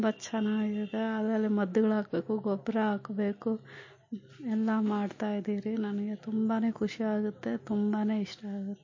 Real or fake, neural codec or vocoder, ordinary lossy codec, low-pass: real; none; MP3, 32 kbps; 7.2 kHz